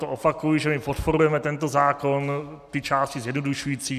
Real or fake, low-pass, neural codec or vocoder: real; 14.4 kHz; none